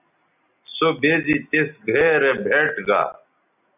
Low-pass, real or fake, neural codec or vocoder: 3.6 kHz; real; none